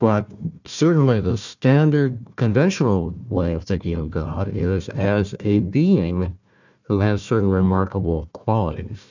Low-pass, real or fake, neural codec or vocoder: 7.2 kHz; fake; codec, 16 kHz, 1 kbps, FunCodec, trained on Chinese and English, 50 frames a second